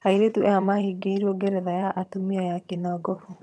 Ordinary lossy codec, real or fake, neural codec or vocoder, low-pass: none; fake; vocoder, 22.05 kHz, 80 mel bands, HiFi-GAN; none